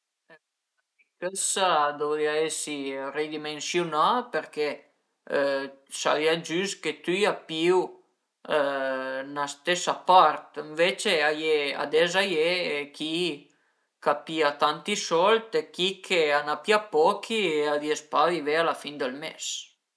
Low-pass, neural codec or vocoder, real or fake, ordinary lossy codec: none; none; real; none